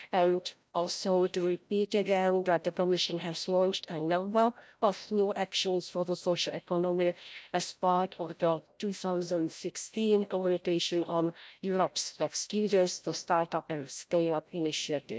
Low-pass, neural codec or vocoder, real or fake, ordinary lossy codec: none; codec, 16 kHz, 0.5 kbps, FreqCodec, larger model; fake; none